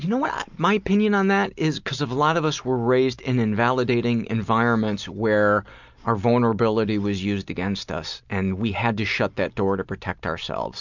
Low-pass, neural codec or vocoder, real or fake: 7.2 kHz; none; real